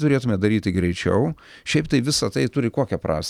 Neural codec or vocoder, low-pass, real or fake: none; 19.8 kHz; real